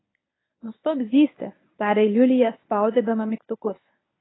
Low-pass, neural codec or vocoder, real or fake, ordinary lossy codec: 7.2 kHz; codec, 24 kHz, 0.9 kbps, WavTokenizer, medium speech release version 1; fake; AAC, 16 kbps